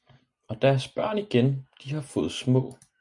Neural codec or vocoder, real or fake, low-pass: none; real; 10.8 kHz